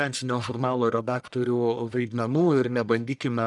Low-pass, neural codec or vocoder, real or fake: 10.8 kHz; codec, 44.1 kHz, 1.7 kbps, Pupu-Codec; fake